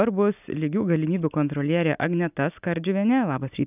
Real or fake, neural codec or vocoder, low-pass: real; none; 3.6 kHz